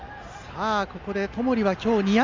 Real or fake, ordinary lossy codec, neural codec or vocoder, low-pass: real; Opus, 32 kbps; none; 7.2 kHz